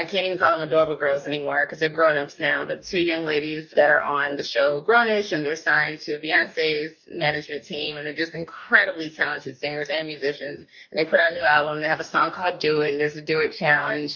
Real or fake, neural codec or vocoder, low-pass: fake; codec, 44.1 kHz, 2.6 kbps, DAC; 7.2 kHz